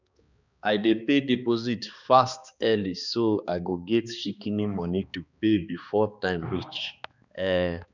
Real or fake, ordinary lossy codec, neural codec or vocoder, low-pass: fake; none; codec, 16 kHz, 2 kbps, X-Codec, HuBERT features, trained on balanced general audio; 7.2 kHz